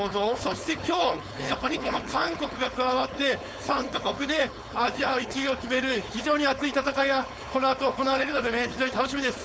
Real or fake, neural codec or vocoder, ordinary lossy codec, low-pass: fake; codec, 16 kHz, 4.8 kbps, FACodec; none; none